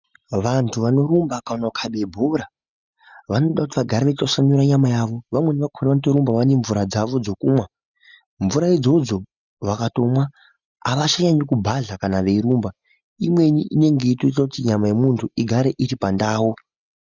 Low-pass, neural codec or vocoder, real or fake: 7.2 kHz; none; real